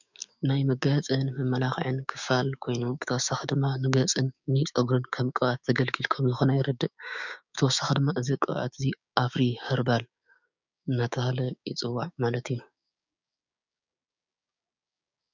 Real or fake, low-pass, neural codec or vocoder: fake; 7.2 kHz; codec, 16 kHz, 6 kbps, DAC